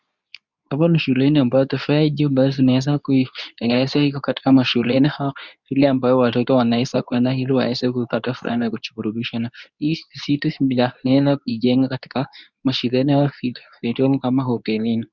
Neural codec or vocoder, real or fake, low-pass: codec, 24 kHz, 0.9 kbps, WavTokenizer, medium speech release version 2; fake; 7.2 kHz